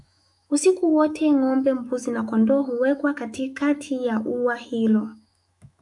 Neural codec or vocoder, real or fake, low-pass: autoencoder, 48 kHz, 128 numbers a frame, DAC-VAE, trained on Japanese speech; fake; 10.8 kHz